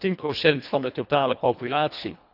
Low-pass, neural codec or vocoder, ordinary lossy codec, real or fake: 5.4 kHz; codec, 24 kHz, 1.5 kbps, HILCodec; none; fake